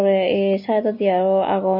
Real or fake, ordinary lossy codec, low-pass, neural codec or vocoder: real; MP3, 24 kbps; 5.4 kHz; none